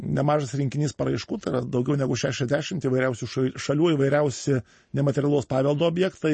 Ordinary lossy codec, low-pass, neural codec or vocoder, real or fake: MP3, 32 kbps; 10.8 kHz; none; real